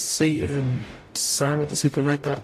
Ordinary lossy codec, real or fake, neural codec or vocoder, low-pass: AAC, 64 kbps; fake; codec, 44.1 kHz, 0.9 kbps, DAC; 14.4 kHz